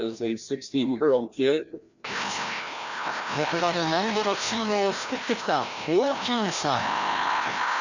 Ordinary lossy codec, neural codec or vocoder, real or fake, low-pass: none; codec, 16 kHz, 1 kbps, FreqCodec, larger model; fake; 7.2 kHz